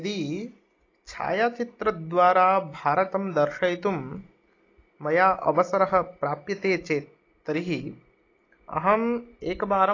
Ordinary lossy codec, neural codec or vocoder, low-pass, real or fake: AAC, 32 kbps; none; 7.2 kHz; real